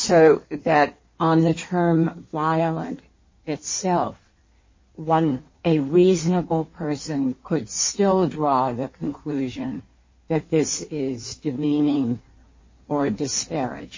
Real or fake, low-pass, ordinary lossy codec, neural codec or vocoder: fake; 7.2 kHz; MP3, 32 kbps; codec, 16 kHz in and 24 kHz out, 1.1 kbps, FireRedTTS-2 codec